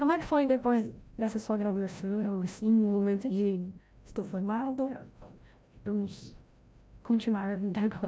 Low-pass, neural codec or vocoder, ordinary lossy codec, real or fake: none; codec, 16 kHz, 0.5 kbps, FreqCodec, larger model; none; fake